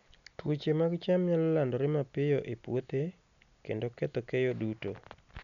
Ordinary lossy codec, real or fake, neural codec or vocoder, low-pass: none; real; none; 7.2 kHz